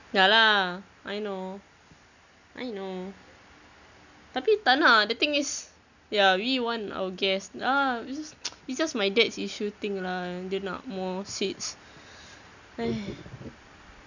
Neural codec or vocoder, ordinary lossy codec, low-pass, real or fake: none; none; 7.2 kHz; real